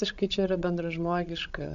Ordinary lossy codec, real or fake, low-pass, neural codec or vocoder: AAC, 64 kbps; fake; 7.2 kHz; codec, 16 kHz, 16 kbps, FreqCodec, larger model